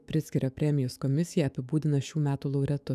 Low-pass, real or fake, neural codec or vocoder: 14.4 kHz; fake; autoencoder, 48 kHz, 128 numbers a frame, DAC-VAE, trained on Japanese speech